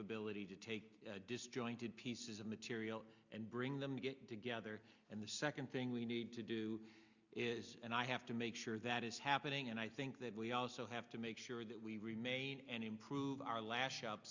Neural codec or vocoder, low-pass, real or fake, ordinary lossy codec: none; 7.2 kHz; real; Opus, 64 kbps